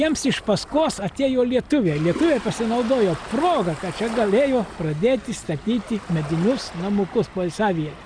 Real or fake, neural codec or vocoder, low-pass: real; none; 9.9 kHz